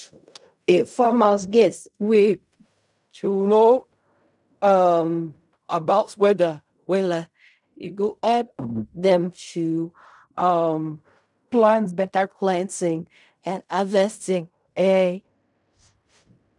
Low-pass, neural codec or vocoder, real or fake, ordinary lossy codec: 10.8 kHz; codec, 16 kHz in and 24 kHz out, 0.4 kbps, LongCat-Audio-Codec, fine tuned four codebook decoder; fake; none